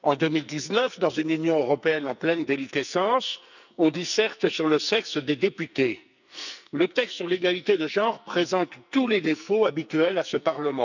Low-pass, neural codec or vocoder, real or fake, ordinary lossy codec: 7.2 kHz; codec, 44.1 kHz, 2.6 kbps, SNAC; fake; none